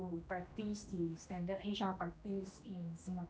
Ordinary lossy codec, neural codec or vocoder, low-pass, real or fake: none; codec, 16 kHz, 1 kbps, X-Codec, HuBERT features, trained on general audio; none; fake